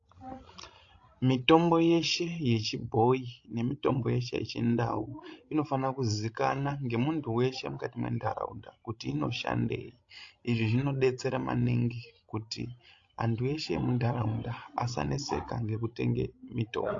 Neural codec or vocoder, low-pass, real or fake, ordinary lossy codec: codec, 16 kHz, 16 kbps, FreqCodec, larger model; 7.2 kHz; fake; AAC, 48 kbps